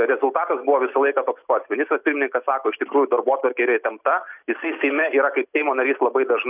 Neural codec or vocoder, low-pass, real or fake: none; 3.6 kHz; real